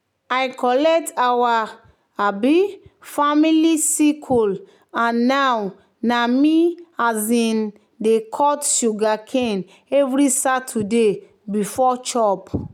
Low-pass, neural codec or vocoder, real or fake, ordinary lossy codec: 19.8 kHz; none; real; none